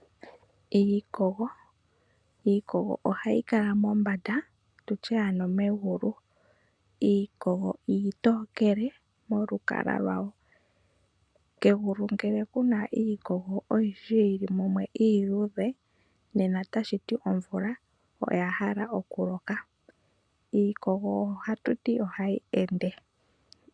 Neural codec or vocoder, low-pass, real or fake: none; 9.9 kHz; real